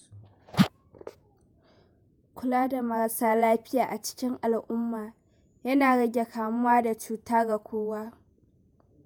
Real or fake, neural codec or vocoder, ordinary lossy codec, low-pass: fake; vocoder, 48 kHz, 128 mel bands, Vocos; none; none